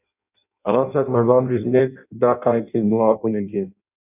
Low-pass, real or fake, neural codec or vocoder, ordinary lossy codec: 3.6 kHz; fake; codec, 16 kHz in and 24 kHz out, 0.6 kbps, FireRedTTS-2 codec; none